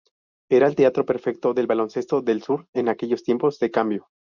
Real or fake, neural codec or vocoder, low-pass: real; none; 7.2 kHz